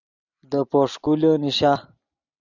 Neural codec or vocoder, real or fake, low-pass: none; real; 7.2 kHz